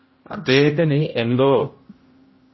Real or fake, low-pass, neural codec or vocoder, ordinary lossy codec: fake; 7.2 kHz; codec, 16 kHz, 0.5 kbps, X-Codec, HuBERT features, trained on general audio; MP3, 24 kbps